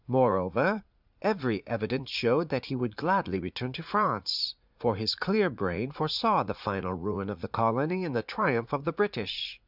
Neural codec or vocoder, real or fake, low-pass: vocoder, 44.1 kHz, 80 mel bands, Vocos; fake; 5.4 kHz